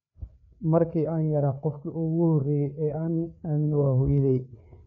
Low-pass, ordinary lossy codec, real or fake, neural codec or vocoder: 7.2 kHz; none; fake; codec, 16 kHz, 4 kbps, FreqCodec, larger model